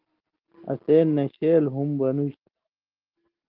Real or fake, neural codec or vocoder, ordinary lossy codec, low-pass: real; none; Opus, 16 kbps; 5.4 kHz